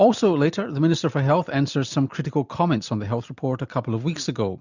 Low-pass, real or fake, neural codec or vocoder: 7.2 kHz; real; none